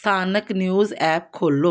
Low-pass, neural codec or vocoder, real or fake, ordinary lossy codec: none; none; real; none